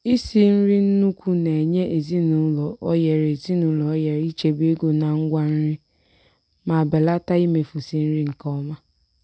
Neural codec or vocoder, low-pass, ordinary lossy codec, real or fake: none; none; none; real